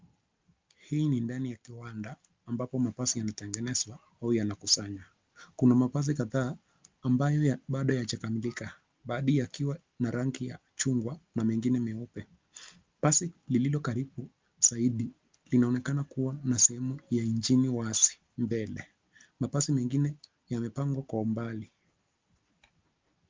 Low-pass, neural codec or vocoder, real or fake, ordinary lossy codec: 7.2 kHz; none; real; Opus, 24 kbps